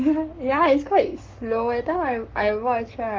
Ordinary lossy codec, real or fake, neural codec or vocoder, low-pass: Opus, 32 kbps; fake; codec, 44.1 kHz, 7.8 kbps, Pupu-Codec; 7.2 kHz